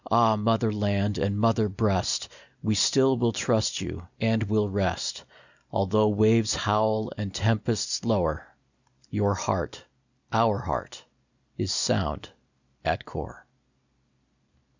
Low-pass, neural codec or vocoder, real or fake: 7.2 kHz; none; real